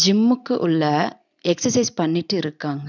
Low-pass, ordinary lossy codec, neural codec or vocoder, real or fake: 7.2 kHz; none; none; real